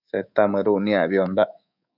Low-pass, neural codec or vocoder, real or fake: 5.4 kHz; codec, 24 kHz, 3.1 kbps, DualCodec; fake